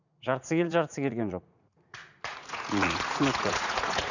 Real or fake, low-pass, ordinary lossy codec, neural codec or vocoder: fake; 7.2 kHz; none; vocoder, 44.1 kHz, 80 mel bands, Vocos